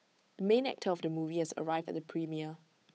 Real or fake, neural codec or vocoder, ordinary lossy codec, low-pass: fake; codec, 16 kHz, 8 kbps, FunCodec, trained on Chinese and English, 25 frames a second; none; none